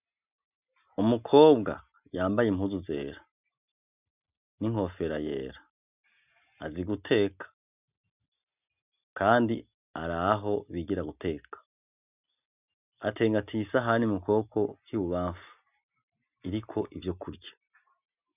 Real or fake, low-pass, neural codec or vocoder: real; 3.6 kHz; none